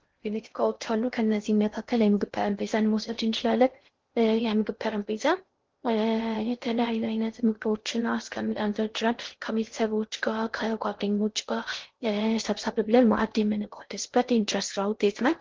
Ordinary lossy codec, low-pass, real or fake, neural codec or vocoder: Opus, 16 kbps; 7.2 kHz; fake; codec, 16 kHz in and 24 kHz out, 0.6 kbps, FocalCodec, streaming, 2048 codes